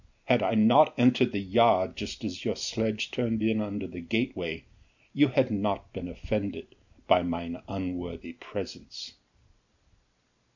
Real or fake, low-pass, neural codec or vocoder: real; 7.2 kHz; none